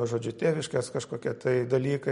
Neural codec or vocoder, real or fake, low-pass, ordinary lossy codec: none; real; 10.8 kHz; MP3, 48 kbps